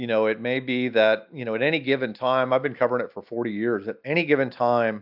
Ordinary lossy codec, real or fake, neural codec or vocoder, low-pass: AAC, 48 kbps; real; none; 5.4 kHz